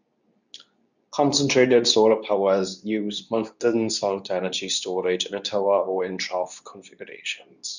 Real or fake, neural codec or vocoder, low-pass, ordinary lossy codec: fake; codec, 24 kHz, 0.9 kbps, WavTokenizer, medium speech release version 2; 7.2 kHz; none